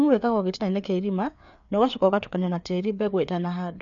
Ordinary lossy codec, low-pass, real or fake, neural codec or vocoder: none; 7.2 kHz; fake; codec, 16 kHz, 4 kbps, FreqCodec, larger model